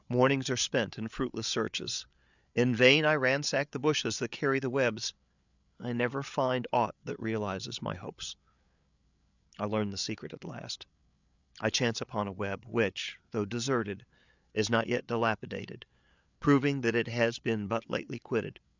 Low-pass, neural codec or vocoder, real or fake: 7.2 kHz; codec, 16 kHz, 8 kbps, FreqCodec, larger model; fake